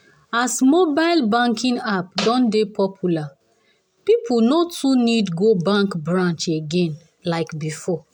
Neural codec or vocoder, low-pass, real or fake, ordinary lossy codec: none; 19.8 kHz; real; none